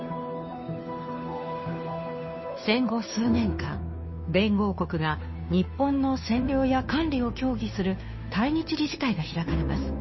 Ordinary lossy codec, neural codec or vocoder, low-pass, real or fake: MP3, 24 kbps; codec, 16 kHz, 2 kbps, FunCodec, trained on Chinese and English, 25 frames a second; 7.2 kHz; fake